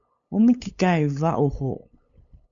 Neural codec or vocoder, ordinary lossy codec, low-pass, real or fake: codec, 16 kHz, 8 kbps, FunCodec, trained on LibriTTS, 25 frames a second; MP3, 64 kbps; 7.2 kHz; fake